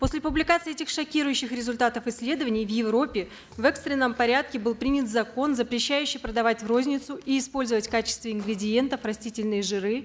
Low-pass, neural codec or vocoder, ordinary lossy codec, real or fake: none; none; none; real